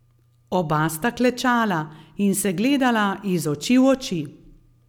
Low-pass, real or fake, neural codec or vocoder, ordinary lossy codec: 19.8 kHz; real; none; none